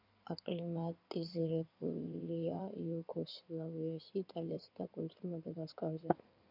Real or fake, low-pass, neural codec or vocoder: fake; 5.4 kHz; codec, 16 kHz in and 24 kHz out, 2.2 kbps, FireRedTTS-2 codec